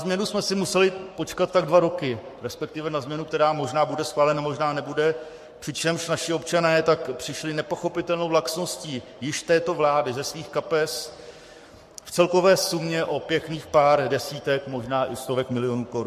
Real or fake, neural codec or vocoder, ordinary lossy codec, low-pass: fake; codec, 44.1 kHz, 7.8 kbps, Pupu-Codec; MP3, 64 kbps; 14.4 kHz